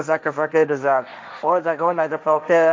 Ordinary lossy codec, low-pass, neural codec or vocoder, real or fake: none; none; codec, 16 kHz, 1.1 kbps, Voila-Tokenizer; fake